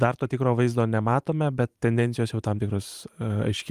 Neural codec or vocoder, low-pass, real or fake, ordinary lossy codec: vocoder, 44.1 kHz, 128 mel bands every 512 samples, BigVGAN v2; 14.4 kHz; fake; Opus, 32 kbps